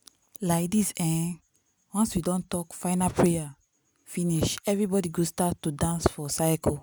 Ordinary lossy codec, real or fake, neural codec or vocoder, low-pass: none; real; none; none